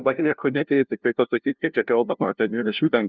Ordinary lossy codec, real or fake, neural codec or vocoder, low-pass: Opus, 32 kbps; fake; codec, 16 kHz, 0.5 kbps, FunCodec, trained on LibriTTS, 25 frames a second; 7.2 kHz